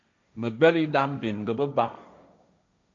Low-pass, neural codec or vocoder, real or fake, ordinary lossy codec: 7.2 kHz; codec, 16 kHz, 1.1 kbps, Voila-Tokenizer; fake; MP3, 64 kbps